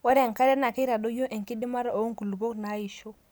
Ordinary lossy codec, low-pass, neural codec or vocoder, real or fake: none; none; none; real